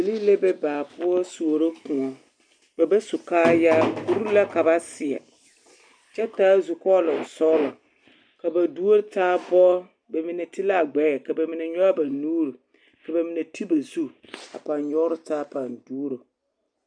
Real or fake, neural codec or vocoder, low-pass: real; none; 9.9 kHz